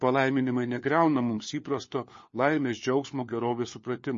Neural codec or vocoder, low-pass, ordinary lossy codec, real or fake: codec, 16 kHz, 4 kbps, FunCodec, trained on LibriTTS, 50 frames a second; 7.2 kHz; MP3, 32 kbps; fake